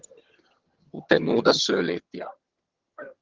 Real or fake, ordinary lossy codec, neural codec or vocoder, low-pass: fake; Opus, 16 kbps; vocoder, 22.05 kHz, 80 mel bands, HiFi-GAN; 7.2 kHz